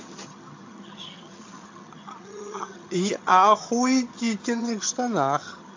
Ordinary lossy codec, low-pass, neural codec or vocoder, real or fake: AAC, 48 kbps; 7.2 kHz; vocoder, 22.05 kHz, 80 mel bands, HiFi-GAN; fake